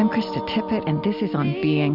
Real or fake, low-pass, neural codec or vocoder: real; 5.4 kHz; none